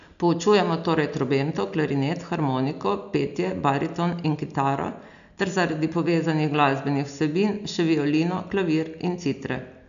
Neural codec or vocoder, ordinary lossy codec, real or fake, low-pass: none; none; real; 7.2 kHz